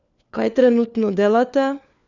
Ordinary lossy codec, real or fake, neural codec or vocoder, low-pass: none; fake; codec, 16 kHz, 4 kbps, FunCodec, trained on LibriTTS, 50 frames a second; 7.2 kHz